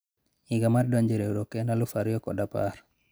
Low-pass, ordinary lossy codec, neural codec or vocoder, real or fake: none; none; none; real